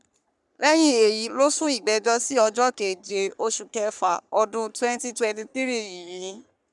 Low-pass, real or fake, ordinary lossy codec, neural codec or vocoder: 10.8 kHz; fake; none; codec, 44.1 kHz, 3.4 kbps, Pupu-Codec